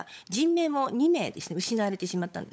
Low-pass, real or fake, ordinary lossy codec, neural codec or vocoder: none; fake; none; codec, 16 kHz, 16 kbps, FunCodec, trained on LibriTTS, 50 frames a second